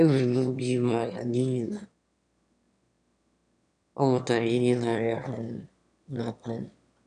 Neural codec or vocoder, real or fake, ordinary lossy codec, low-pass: autoencoder, 22.05 kHz, a latent of 192 numbers a frame, VITS, trained on one speaker; fake; none; 9.9 kHz